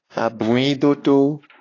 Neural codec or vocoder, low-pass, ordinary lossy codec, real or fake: codec, 16 kHz, 2 kbps, X-Codec, WavLM features, trained on Multilingual LibriSpeech; 7.2 kHz; AAC, 32 kbps; fake